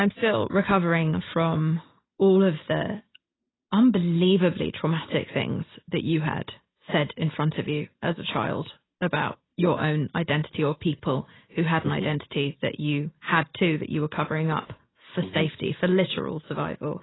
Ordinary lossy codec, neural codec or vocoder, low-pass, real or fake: AAC, 16 kbps; none; 7.2 kHz; real